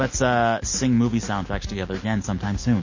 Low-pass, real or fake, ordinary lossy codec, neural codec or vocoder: 7.2 kHz; real; MP3, 32 kbps; none